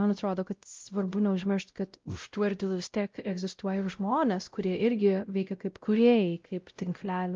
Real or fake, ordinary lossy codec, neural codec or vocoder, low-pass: fake; Opus, 32 kbps; codec, 16 kHz, 0.5 kbps, X-Codec, WavLM features, trained on Multilingual LibriSpeech; 7.2 kHz